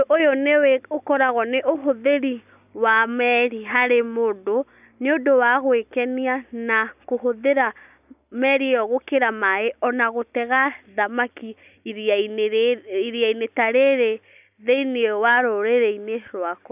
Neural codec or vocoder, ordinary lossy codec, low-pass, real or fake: none; none; 3.6 kHz; real